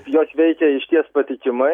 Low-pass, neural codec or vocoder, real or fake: 19.8 kHz; none; real